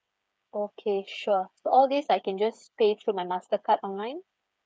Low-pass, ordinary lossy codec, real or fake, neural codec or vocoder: none; none; fake; codec, 16 kHz, 8 kbps, FreqCodec, smaller model